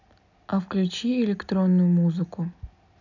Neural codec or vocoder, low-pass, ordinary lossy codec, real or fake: none; 7.2 kHz; none; real